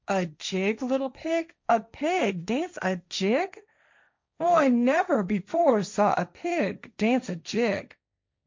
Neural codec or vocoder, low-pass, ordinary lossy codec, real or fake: codec, 16 kHz, 1.1 kbps, Voila-Tokenizer; 7.2 kHz; MP3, 64 kbps; fake